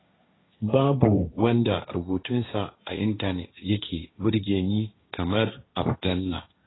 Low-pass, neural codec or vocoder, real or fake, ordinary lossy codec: 7.2 kHz; codec, 16 kHz, 1.1 kbps, Voila-Tokenizer; fake; AAC, 16 kbps